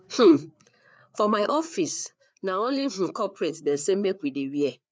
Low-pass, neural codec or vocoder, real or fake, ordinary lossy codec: none; codec, 16 kHz, 4 kbps, FreqCodec, larger model; fake; none